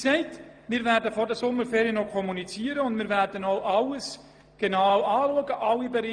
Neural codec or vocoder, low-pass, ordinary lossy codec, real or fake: none; 9.9 kHz; Opus, 32 kbps; real